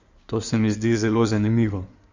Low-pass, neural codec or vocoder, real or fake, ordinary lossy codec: 7.2 kHz; codec, 16 kHz in and 24 kHz out, 2.2 kbps, FireRedTTS-2 codec; fake; Opus, 64 kbps